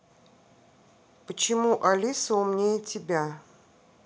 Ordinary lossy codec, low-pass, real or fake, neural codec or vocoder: none; none; real; none